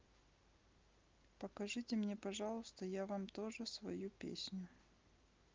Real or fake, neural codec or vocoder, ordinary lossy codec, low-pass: real; none; Opus, 32 kbps; 7.2 kHz